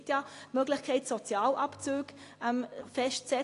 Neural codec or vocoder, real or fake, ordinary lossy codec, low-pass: none; real; AAC, 48 kbps; 10.8 kHz